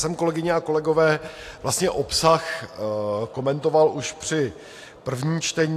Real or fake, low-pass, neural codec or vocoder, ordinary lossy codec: real; 14.4 kHz; none; AAC, 64 kbps